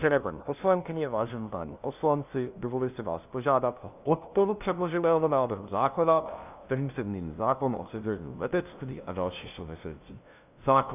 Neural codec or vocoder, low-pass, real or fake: codec, 16 kHz, 0.5 kbps, FunCodec, trained on LibriTTS, 25 frames a second; 3.6 kHz; fake